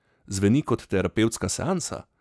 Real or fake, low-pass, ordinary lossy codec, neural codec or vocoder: real; none; none; none